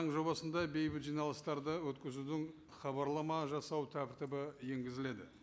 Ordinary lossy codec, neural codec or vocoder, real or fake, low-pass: none; none; real; none